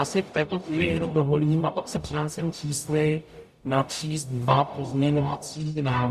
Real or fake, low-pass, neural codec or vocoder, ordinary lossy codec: fake; 14.4 kHz; codec, 44.1 kHz, 0.9 kbps, DAC; Opus, 64 kbps